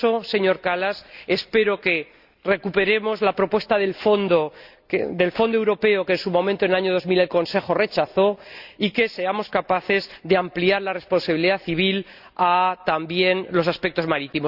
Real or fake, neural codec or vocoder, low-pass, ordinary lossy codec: real; none; 5.4 kHz; Opus, 64 kbps